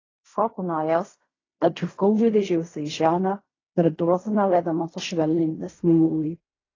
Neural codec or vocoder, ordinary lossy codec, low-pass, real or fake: codec, 16 kHz in and 24 kHz out, 0.4 kbps, LongCat-Audio-Codec, fine tuned four codebook decoder; AAC, 32 kbps; 7.2 kHz; fake